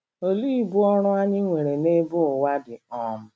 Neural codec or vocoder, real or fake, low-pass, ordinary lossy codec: none; real; none; none